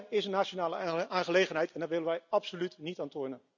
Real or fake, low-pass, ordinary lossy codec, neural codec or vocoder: real; 7.2 kHz; none; none